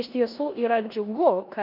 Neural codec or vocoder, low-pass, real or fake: codec, 16 kHz in and 24 kHz out, 0.9 kbps, LongCat-Audio-Codec, four codebook decoder; 5.4 kHz; fake